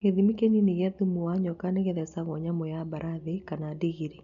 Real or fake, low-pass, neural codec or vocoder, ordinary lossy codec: real; 7.2 kHz; none; none